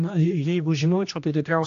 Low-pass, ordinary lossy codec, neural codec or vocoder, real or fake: 7.2 kHz; AAC, 48 kbps; codec, 16 kHz, 1 kbps, X-Codec, HuBERT features, trained on general audio; fake